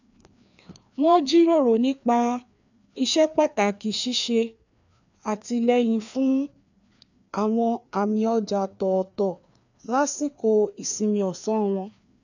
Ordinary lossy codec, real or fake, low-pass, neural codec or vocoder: none; fake; 7.2 kHz; codec, 16 kHz, 2 kbps, FreqCodec, larger model